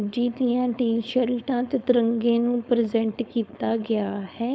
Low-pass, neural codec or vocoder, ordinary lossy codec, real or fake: none; codec, 16 kHz, 4.8 kbps, FACodec; none; fake